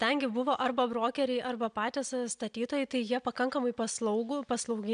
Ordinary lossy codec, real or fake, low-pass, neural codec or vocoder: MP3, 96 kbps; real; 9.9 kHz; none